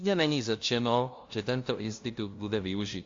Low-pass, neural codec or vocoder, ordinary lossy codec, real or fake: 7.2 kHz; codec, 16 kHz, 0.5 kbps, FunCodec, trained on LibriTTS, 25 frames a second; AAC, 48 kbps; fake